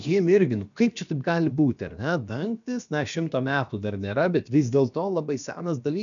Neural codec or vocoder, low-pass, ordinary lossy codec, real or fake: codec, 16 kHz, about 1 kbps, DyCAST, with the encoder's durations; 7.2 kHz; MP3, 96 kbps; fake